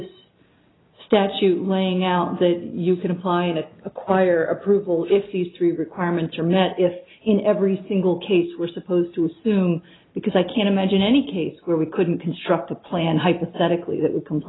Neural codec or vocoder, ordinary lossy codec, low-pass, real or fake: none; AAC, 16 kbps; 7.2 kHz; real